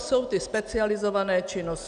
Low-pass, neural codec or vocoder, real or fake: 9.9 kHz; none; real